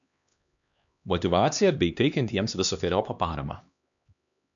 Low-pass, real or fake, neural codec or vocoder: 7.2 kHz; fake; codec, 16 kHz, 2 kbps, X-Codec, HuBERT features, trained on LibriSpeech